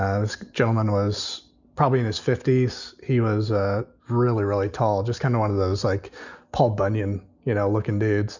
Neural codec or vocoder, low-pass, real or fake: none; 7.2 kHz; real